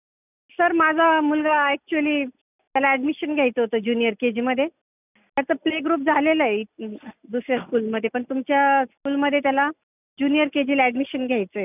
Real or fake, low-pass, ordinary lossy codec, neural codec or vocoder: real; 3.6 kHz; none; none